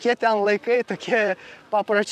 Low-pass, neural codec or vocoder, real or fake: 14.4 kHz; vocoder, 44.1 kHz, 128 mel bands, Pupu-Vocoder; fake